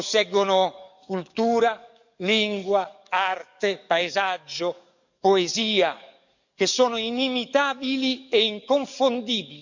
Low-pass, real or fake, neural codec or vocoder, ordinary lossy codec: 7.2 kHz; fake; codec, 44.1 kHz, 7.8 kbps, DAC; none